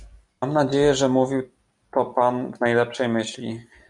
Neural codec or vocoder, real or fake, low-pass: none; real; 10.8 kHz